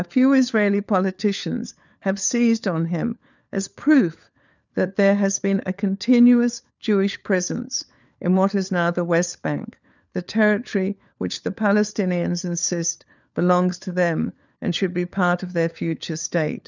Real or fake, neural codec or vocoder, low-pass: fake; codec, 16 kHz, 4 kbps, FunCodec, trained on LibriTTS, 50 frames a second; 7.2 kHz